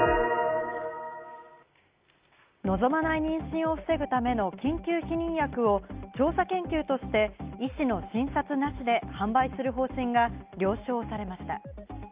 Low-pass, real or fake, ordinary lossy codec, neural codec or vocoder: 3.6 kHz; real; Opus, 32 kbps; none